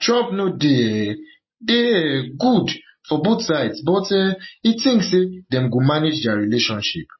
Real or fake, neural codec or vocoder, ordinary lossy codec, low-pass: real; none; MP3, 24 kbps; 7.2 kHz